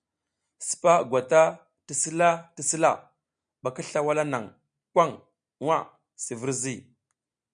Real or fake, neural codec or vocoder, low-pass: real; none; 9.9 kHz